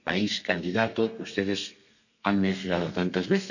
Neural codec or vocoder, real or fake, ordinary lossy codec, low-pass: codec, 32 kHz, 1.9 kbps, SNAC; fake; none; 7.2 kHz